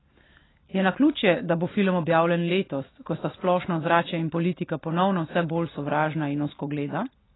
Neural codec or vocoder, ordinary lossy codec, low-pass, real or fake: none; AAC, 16 kbps; 7.2 kHz; real